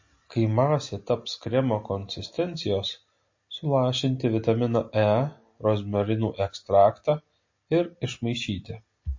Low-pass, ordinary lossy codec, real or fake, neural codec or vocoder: 7.2 kHz; MP3, 32 kbps; real; none